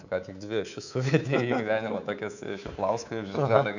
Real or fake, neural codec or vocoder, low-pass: fake; codec, 24 kHz, 3.1 kbps, DualCodec; 7.2 kHz